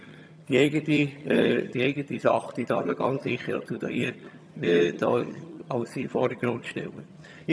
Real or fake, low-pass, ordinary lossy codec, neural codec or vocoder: fake; none; none; vocoder, 22.05 kHz, 80 mel bands, HiFi-GAN